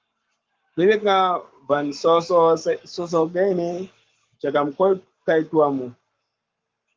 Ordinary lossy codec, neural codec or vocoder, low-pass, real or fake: Opus, 32 kbps; codec, 44.1 kHz, 7.8 kbps, Pupu-Codec; 7.2 kHz; fake